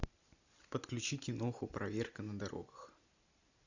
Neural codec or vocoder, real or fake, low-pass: none; real; 7.2 kHz